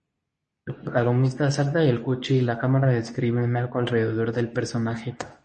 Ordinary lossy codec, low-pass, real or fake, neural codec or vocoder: MP3, 32 kbps; 10.8 kHz; fake; codec, 24 kHz, 0.9 kbps, WavTokenizer, medium speech release version 2